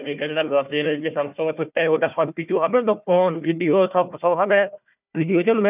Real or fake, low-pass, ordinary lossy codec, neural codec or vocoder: fake; 3.6 kHz; none; codec, 16 kHz, 1 kbps, FunCodec, trained on Chinese and English, 50 frames a second